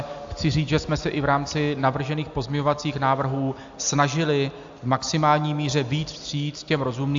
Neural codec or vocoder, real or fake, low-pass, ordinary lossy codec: none; real; 7.2 kHz; AAC, 64 kbps